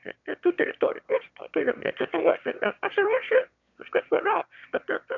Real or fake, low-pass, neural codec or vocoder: fake; 7.2 kHz; autoencoder, 22.05 kHz, a latent of 192 numbers a frame, VITS, trained on one speaker